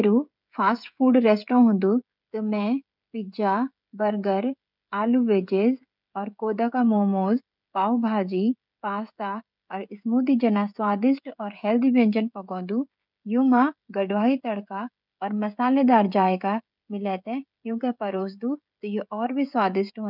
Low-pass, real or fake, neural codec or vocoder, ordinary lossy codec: 5.4 kHz; fake; codec, 16 kHz, 16 kbps, FreqCodec, smaller model; none